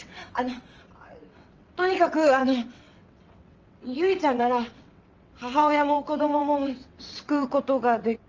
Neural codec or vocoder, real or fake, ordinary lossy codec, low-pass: vocoder, 22.05 kHz, 80 mel bands, WaveNeXt; fake; Opus, 24 kbps; 7.2 kHz